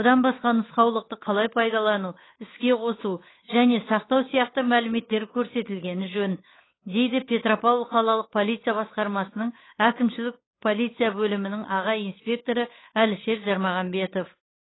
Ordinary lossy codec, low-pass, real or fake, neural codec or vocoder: AAC, 16 kbps; 7.2 kHz; fake; codec, 16 kHz, 8 kbps, FunCodec, trained on Chinese and English, 25 frames a second